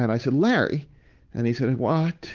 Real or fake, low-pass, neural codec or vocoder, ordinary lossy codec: fake; 7.2 kHz; codec, 16 kHz, 8 kbps, FunCodec, trained on Chinese and English, 25 frames a second; Opus, 24 kbps